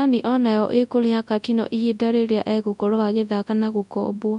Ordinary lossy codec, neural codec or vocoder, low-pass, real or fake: MP3, 64 kbps; codec, 24 kHz, 0.9 kbps, WavTokenizer, large speech release; 10.8 kHz; fake